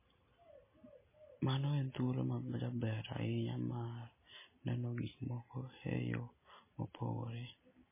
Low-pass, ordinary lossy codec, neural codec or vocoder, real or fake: 3.6 kHz; MP3, 16 kbps; none; real